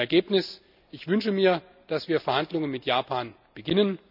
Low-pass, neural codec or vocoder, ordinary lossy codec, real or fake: 5.4 kHz; none; none; real